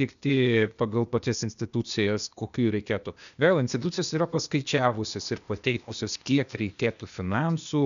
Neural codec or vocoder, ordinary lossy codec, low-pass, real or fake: codec, 16 kHz, 0.8 kbps, ZipCodec; MP3, 96 kbps; 7.2 kHz; fake